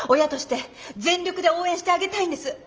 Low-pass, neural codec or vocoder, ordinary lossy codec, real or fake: 7.2 kHz; none; Opus, 24 kbps; real